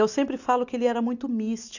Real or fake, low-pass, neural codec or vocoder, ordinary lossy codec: real; 7.2 kHz; none; none